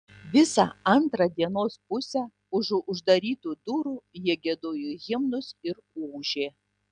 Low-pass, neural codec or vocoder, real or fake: 9.9 kHz; none; real